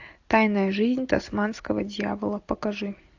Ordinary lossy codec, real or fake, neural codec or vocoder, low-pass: AAC, 48 kbps; real; none; 7.2 kHz